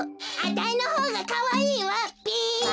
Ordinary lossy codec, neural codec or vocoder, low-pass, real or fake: none; none; none; real